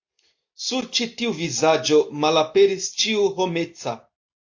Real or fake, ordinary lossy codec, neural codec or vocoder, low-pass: real; AAC, 48 kbps; none; 7.2 kHz